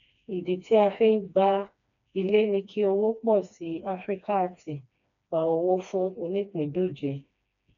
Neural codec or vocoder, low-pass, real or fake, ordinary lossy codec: codec, 16 kHz, 2 kbps, FreqCodec, smaller model; 7.2 kHz; fake; none